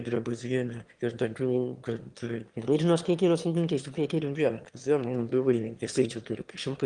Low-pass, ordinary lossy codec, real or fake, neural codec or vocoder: 9.9 kHz; Opus, 24 kbps; fake; autoencoder, 22.05 kHz, a latent of 192 numbers a frame, VITS, trained on one speaker